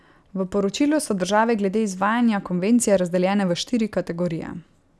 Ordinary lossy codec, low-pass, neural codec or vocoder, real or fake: none; none; none; real